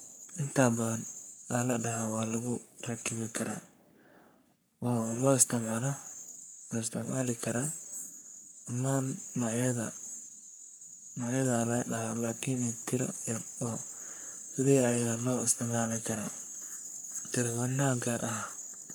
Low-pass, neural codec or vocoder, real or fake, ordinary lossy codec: none; codec, 44.1 kHz, 3.4 kbps, Pupu-Codec; fake; none